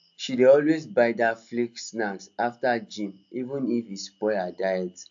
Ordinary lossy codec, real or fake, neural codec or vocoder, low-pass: AAC, 64 kbps; real; none; 7.2 kHz